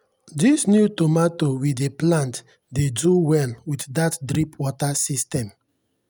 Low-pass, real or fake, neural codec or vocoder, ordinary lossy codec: none; real; none; none